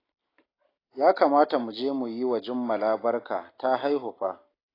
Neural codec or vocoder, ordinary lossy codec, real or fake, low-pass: none; AAC, 24 kbps; real; 5.4 kHz